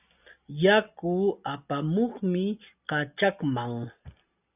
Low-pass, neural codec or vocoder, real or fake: 3.6 kHz; none; real